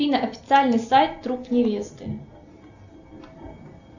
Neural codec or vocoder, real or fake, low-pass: none; real; 7.2 kHz